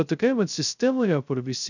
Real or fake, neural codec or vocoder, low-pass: fake; codec, 16 kHz, 0.2 kbps, FocalCodec; 7.2 kHz